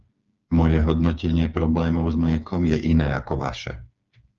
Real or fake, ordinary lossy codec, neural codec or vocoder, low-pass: fake; Opus, 24 kbps; codec, 16 kHz, 4 kbps, FreqCodec, smaller model; 7.2 kHz